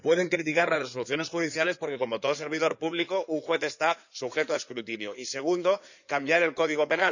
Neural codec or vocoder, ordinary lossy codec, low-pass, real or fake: codec, 16 kHz in and 24 kHz out, 2.2 kbps, FireRedTTS-2 codec; none; 7.2 kHz; fake